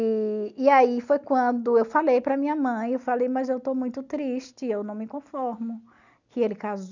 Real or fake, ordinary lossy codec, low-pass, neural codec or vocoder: real; none; 7.2 kHz; none